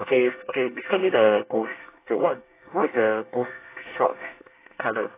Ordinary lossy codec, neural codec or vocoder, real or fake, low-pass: AAC, 24 kbps; codec, 24 kHz, 1 kbps, SNAC; fake; 3.6 kHz